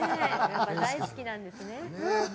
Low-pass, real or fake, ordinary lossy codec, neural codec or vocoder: none; real; none; none